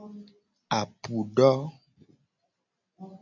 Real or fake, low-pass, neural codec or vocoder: real; 7.2 kHz; none